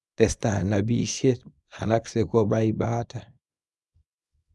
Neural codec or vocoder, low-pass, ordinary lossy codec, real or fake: codec, 24 kHz, 0.9 kbps, WavTokenizer, small release; none; none; fake